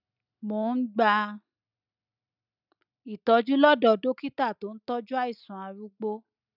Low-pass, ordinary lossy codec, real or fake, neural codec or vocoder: 5.4 kHz; none; real; none